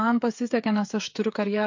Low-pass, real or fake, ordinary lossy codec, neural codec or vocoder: 7.2 kHz; fake; MP3, 48 kbps; codec, 16 kHz, 8 kbps, FreqCodec, smaller model